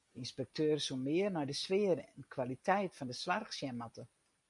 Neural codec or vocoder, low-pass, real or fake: none; 10.8 kHz; real